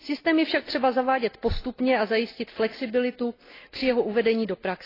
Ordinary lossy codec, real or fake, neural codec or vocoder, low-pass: AAC, 24 kbps; real; none; 5.4 kHz